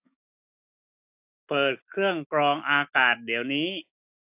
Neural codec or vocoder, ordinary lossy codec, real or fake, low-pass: autoencoder, 48 kHz, 128 numbers a frame, DAC-VAE, trained on Japanese speech; AAC, 32 kbps; fake; 3.6 kHz